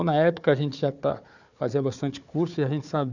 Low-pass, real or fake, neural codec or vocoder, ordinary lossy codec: 7.2 kHz; fake; codec, 16 kHz, 4 kbps, FunCodec, trained on Chinese and English, 50 frames a second; none